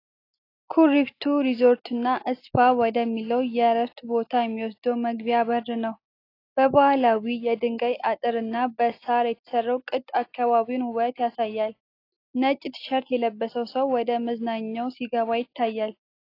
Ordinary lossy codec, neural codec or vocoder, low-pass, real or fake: AAC, 32 kbps; none; 5.4 kHz; real